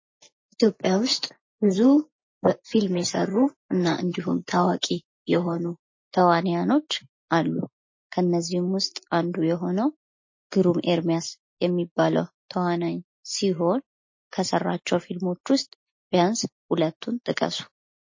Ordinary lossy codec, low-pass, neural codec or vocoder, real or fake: MP3, 32 kbps; 7.2 kHz; none; real